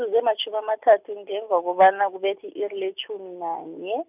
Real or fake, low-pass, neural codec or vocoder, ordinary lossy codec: real; 3.6 kHz; none; none